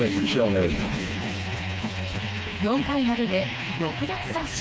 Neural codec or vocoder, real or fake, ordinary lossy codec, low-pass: codec, 16 kHz, 2 kbps, FreqCodec, smaller model; fake; none; none